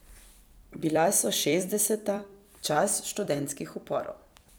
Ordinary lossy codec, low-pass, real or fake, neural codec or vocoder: none; none; fake; vocoder, 44.1 kHz, 128 mel bands every 256 samples, BigVGAN v2